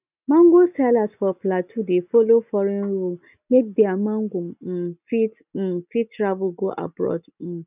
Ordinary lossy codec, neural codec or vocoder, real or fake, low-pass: none; none; real; 3.6 kHz